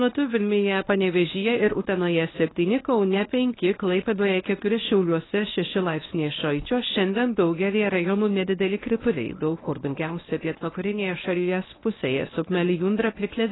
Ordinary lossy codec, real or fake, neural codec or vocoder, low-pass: AAC, 16 kbps; fake; codec, 24 kHz, 0.9 kbps, WavTokenizer, medium speech release version 1; 7.2 kHz